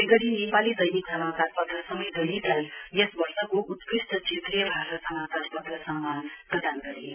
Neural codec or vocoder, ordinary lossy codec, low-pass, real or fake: none; none; 3.6 kHz; real